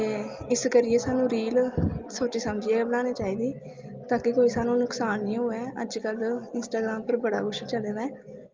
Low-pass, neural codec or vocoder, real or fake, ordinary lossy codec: 7.2 kHz; none; real; Opus, 32 kbps